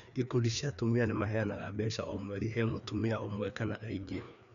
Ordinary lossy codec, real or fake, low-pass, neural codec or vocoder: none; fake; 7.2 kHz; codec, 16 kHz, 2 kbps, FreqCodec, larger model